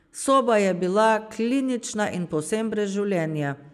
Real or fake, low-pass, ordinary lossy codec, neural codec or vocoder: real; 14.4 kHz; none; none